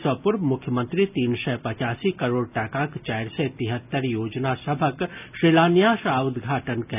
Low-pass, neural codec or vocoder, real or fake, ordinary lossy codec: 3.6 kHz; none; real; none